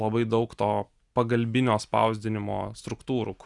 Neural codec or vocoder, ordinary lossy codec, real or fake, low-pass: none; Opus, 64 kbps; real; 10.8 kHz